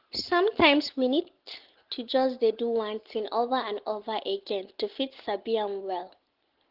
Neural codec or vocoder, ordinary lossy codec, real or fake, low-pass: none; Opus, 16 kbps; real; 5.4 kHz